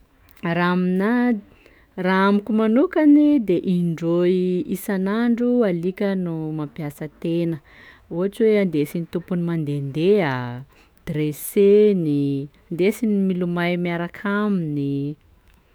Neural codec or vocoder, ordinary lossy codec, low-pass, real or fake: autoencoder, 48 kHz, 128 numbers a frame, DAC-VAE, trained on Japanese speech; none; none; fake